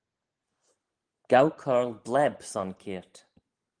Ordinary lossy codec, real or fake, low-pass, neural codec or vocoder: Opus, 24 kbps; real; 9.9 kHz; none